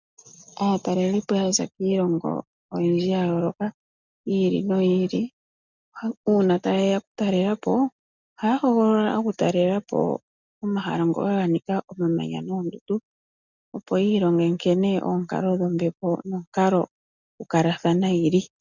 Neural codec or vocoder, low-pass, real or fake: none; 7.2 kHz; real